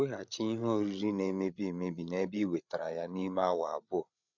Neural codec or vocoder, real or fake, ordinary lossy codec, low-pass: codec, 16 kHz, 8 kbps, FreqCodec, larger model; fake; none; 7.2 kHz